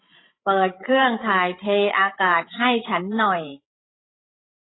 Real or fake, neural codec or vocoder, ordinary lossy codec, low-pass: fake; codec, 16 kHz, 8 kbps, FreqCodec, larger model; AAC, 16 kbps; 7.2 kHz